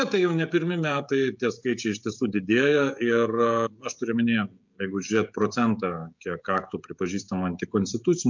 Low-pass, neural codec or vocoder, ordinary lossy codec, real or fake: 7.2 kHz; codec, 16 kHz, 16 kbps, FreqCodec, smaller model; MP3, 64 kbps; fake